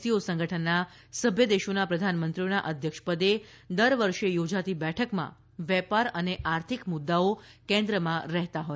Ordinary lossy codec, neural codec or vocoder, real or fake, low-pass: none; none; real; none